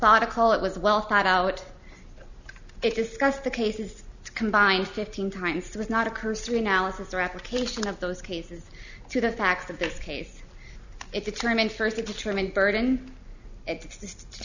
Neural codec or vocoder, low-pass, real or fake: none; 7.2 kHz; real